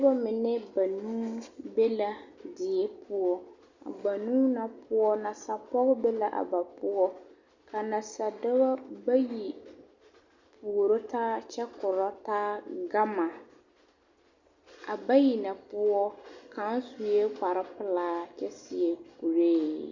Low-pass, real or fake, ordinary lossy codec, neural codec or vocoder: 7.2 kHz; real; Opus, 64 kbps; none